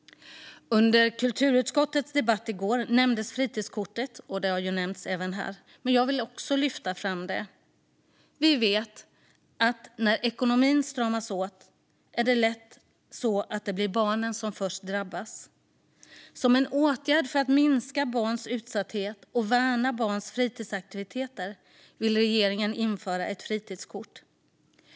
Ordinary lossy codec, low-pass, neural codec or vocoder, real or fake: none; none; none; real